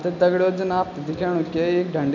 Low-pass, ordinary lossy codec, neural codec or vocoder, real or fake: 7.2 kHz; none; none; real